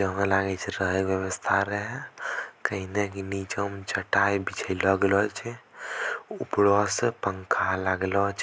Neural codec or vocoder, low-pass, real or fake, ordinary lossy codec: none; none; real; none